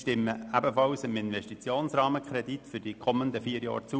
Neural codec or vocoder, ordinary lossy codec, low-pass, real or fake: none; none; none; real